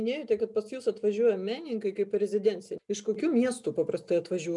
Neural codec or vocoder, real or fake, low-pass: none; real; 10.8 kHz